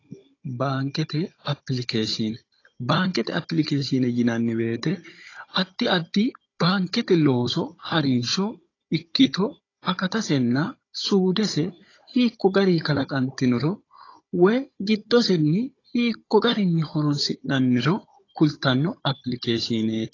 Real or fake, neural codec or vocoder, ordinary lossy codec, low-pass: fake; codec, 16 kHz, 16 kbps, FunCodec, trained on Chinese and English, 50 frames a second; AAC, 32 kbps; 7.2 kHz